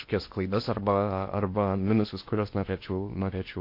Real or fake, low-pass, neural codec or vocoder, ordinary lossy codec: fake; 5.4 kHz; codec, 16 kHz in and 24 kHz out, 0.8 kbps, FocalCodec, streaming, 65536 codes; MP3, 32 kbps